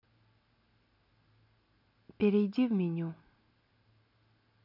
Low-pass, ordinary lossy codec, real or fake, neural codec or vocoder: 5.4 kHz; none; real; none